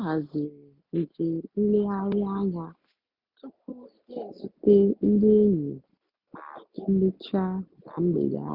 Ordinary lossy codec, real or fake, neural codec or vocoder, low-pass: none; real; none; 5.4 kHz